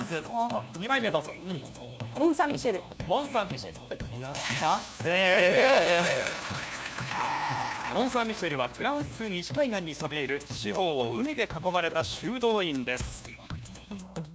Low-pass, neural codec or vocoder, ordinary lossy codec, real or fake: none; codec, 16 kHz, 1 kbps, FunCodec, trained on LibriTTS, 50 frames a second; none; fake